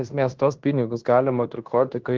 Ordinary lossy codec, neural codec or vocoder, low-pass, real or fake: Opus, 16 kbps; codec, 16 kHz in and 24 kHz out, 0.9 kbps, LongCat-Audio-Codec, fine tuned four codebook decoder; 7.2 kHz; fake